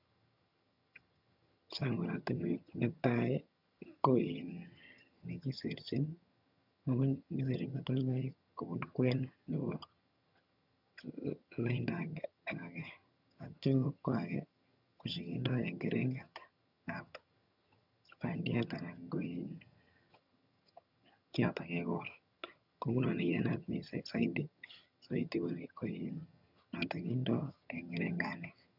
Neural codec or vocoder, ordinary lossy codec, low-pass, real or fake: vocoder, 22.05 kHz, 80 mel bands, HiFi-GAN; none; 5.4 kHz; fake